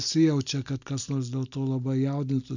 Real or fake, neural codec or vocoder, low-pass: real; none; 7.2 kHz